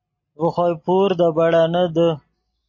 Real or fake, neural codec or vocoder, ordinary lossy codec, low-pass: real; none; MP3, 32 kbps; 7.2 kHz